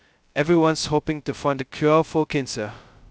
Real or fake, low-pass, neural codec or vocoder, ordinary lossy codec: fake; none; codec, 16 kHz, 0.2 kbps, FocalCodec; none